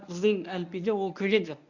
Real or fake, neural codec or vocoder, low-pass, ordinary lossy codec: fake; codec, 24 kHz, 0.9 kbps, WavTokenizer, medium speech release version 1; 7.2 kHz; none